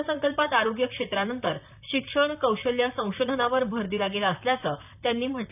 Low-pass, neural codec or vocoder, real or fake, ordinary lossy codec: 3.6 kHz; vocoder, 44.1 kHz, 128 mel bands, Pupu-Vocoder; fake; none